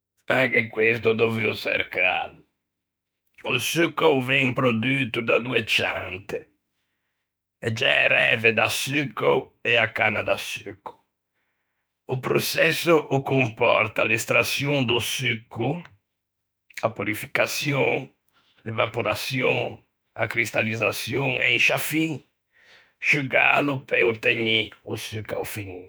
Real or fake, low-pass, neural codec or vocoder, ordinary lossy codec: fake; none; autoencoder, 48 kHz, 32 numbers a frame, DAC-VAE, trained on Japanese speech; none